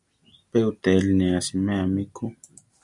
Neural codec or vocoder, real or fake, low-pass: none; real; 10.8 kHz